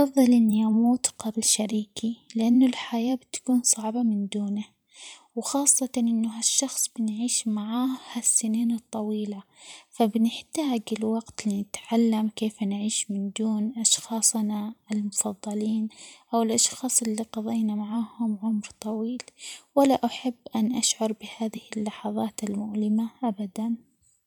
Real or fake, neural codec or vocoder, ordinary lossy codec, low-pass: fake; vocoder, 44.1 kHz, 128 mel bands every 512 samples, BigVGAN v2; none; none